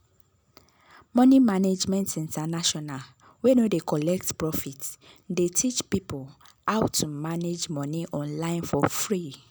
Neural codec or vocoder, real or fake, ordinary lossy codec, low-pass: none; real; none; none